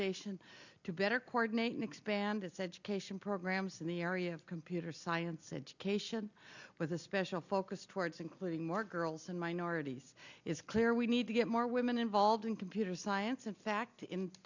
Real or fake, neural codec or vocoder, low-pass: real; none; 7.2 kHz